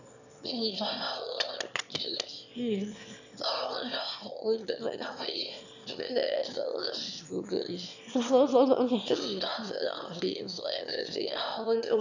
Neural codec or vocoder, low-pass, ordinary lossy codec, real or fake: autoencoder, 22.05 kHz, a latent of 192 numbers a frame, VITS, trained on one speaker; 7.2 kHz; none; fake